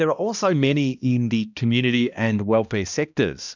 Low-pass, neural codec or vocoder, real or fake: 7.2 kHz; codec, 16 kHz, 1 kbps, X-Codec, HuBERT features, trained on balanced general audio; fake